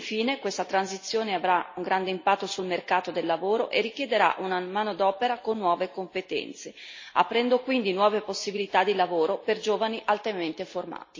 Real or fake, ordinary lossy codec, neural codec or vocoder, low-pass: real; MP3, 32 kbps; none; 7.2 kHz